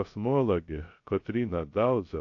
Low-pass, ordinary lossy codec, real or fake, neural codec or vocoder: 7.2 kHz; AAC, 48 kbps; fake; codec, 16 kHz, 0.3 kbps, FocalCodec